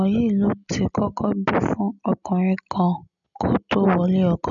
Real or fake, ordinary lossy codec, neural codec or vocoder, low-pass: real; none; none; 7.2 kHz